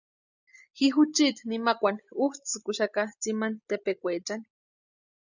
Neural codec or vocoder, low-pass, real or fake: none; 7.2 kHz; real